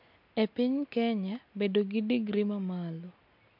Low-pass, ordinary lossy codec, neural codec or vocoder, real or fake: 5.4 kHz; MP3, 48 kbps; none; real